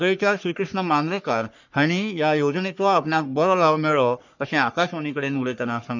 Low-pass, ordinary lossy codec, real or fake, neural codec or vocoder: 7.2 kHz; none; fake; codec, 44.1 kHz, 3.4 kbps, Pupu-Codec